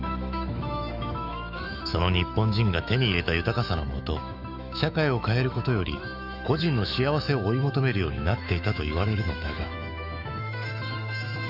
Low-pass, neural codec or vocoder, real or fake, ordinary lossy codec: 5.4 kHz; autoencoder, 48 kHz, 128 numbers a frame, DAC-VAE, trained on Japanese speech; fake; none